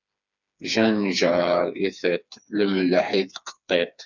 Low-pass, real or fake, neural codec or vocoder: 7.2 kHz; fake; codec, 16 kHz, 4 kbps, FreqCodec, smaller model